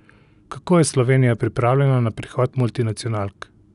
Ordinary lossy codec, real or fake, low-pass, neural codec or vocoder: none; real; 10.8 kHz; none